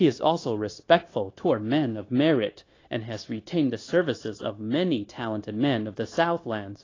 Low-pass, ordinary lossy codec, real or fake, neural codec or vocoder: 7.2 kHz; AAC, 32 kbps; fake; codec, 16 kHz, 0.9 kbps, LongCat-Audio-Codec